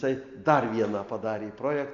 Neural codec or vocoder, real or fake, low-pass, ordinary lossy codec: none; real; 7.2 kHz; MP3, 48 kbps